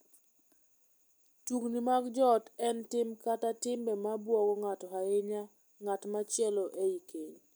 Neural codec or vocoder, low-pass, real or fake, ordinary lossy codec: none; none; real; none